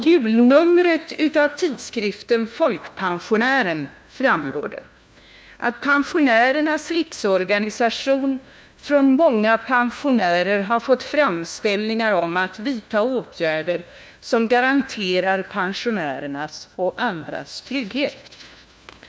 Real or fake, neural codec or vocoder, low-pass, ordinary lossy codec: fake; codec, 16 kHz, 1 kbps, FunCodec, trained on LibriTTS, 50 frames a second; none; none